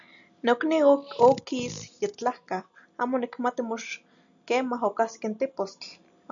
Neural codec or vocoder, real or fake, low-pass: none; real; 7.2 kHz